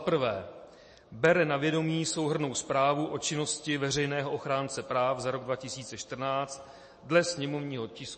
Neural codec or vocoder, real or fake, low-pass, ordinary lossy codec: none; real; 10.8 kHz; MP3, 32 kbps